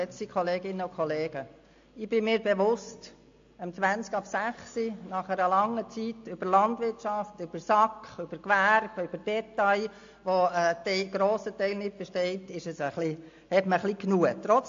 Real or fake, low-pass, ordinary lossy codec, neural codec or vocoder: real; 7.2 kHz; AAC, 48 kbps; none